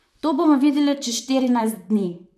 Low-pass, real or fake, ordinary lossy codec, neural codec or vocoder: 14.4 kHz; fake; none; vocoder, 44.1 kHz, 128 mel bands, Pupu-Vocoder